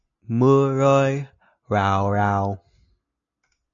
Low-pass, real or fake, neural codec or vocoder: 7.2 kHz; real; none